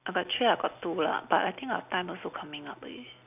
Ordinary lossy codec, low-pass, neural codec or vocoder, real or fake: none; 3.6 kHz; none; real